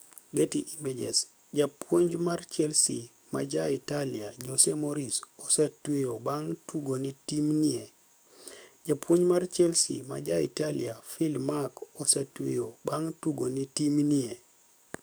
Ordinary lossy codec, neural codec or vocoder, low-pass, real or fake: none; codec, 44.1 kHz, 7.8 kbps, DAC; none; fake